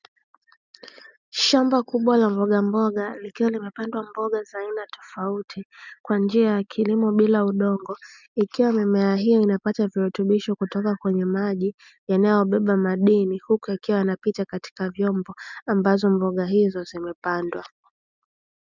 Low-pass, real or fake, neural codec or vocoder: 7.2 kHz; real; none